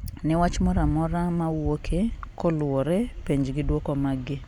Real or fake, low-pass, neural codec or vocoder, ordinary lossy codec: real; 19.8 kHz; none; none